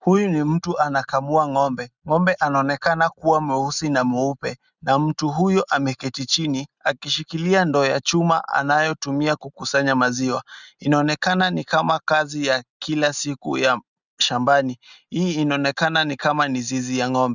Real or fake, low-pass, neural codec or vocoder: real; 7.2 kHz; none